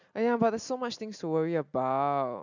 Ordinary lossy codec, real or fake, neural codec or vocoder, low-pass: none; real; none; 7.2 kHz